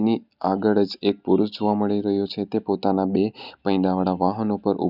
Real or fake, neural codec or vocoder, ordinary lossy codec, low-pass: real; none; none; 5.4 kHz